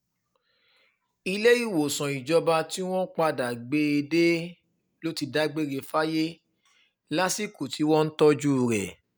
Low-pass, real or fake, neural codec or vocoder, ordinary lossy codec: none; real; none; none